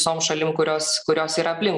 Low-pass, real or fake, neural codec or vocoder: 10.8 kHz; real; none